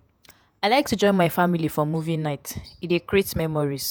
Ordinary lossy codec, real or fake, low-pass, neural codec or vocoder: none; fake; none; vocoder, 48 kHz, 128 mel bands, Vocos